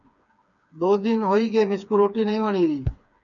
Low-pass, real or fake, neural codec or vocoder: 7.2 kHz; fake; codec, 16 kHz, 4 kbps, FreqCodec, smaller model